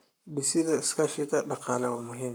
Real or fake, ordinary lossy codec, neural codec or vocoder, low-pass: fake; none; codec, 44.1 kHz, 7.8 kbps, Pupu-Codec; none